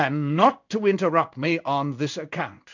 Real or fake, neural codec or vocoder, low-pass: fake; codec, 16 kHz in and 24 kHz out, 1 kbps, XY-Tokenizer; 7.2 kHz